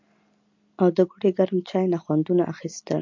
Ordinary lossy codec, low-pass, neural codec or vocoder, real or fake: MP3, 48 kbps; 7.2 kHz; none; real